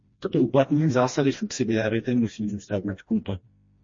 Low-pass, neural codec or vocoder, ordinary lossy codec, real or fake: 7.2 kHz; codec, 16 kHz, 1 kbps, FreqCodec, smaller model; MP3, 32 kbps; fake